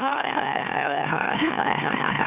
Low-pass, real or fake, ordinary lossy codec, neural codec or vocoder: 3.6 kHz; fake; AAC, 32 kbps; autoencoder, 44.1 kHz, a latent of 192 numbers a frame, MeloTTS